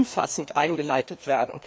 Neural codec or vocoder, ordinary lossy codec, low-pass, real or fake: codec, 16 kHz, 2 kbps, FreqCodec, larger model; none; none; fake